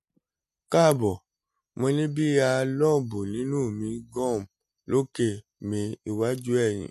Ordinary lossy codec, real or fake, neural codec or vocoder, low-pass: MP3, 64 kbps; real; none; 14.4 kHz